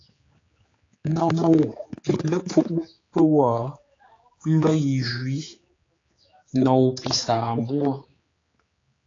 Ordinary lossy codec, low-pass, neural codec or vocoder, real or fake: AAC, 32 kbps; 7.2 kHz; codec, 16 kHz, 4 kbps, X-Codec, HuBERT features, trained on general audio; fake